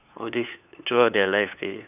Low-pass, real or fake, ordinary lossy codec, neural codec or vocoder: 3.6 kHz; fake; none; codec, 16 kHz, 2 kbps, FunCodec, trained on LibriTTS, 25 frames a second